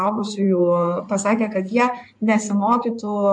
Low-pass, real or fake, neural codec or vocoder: 9.9 kHz; fake; codec, 16 kHz in and 24 kHz out, 2.2 kbps, FireRedTTS-2 codec